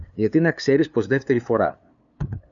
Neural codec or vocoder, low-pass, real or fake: codec, 16 kHz, 2 kbps, FunCodec, trained on LibriTTS, 25 frames a second; 7.2 kHz; fake